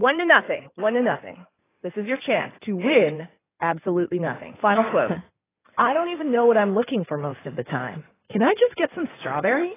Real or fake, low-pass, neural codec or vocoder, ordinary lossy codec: fake; 3.6 kHz; codec, 16 kHz in and 24 kHz out, 2.2 kbps, FireRedTTS-2 codec; AAC, 16 kbps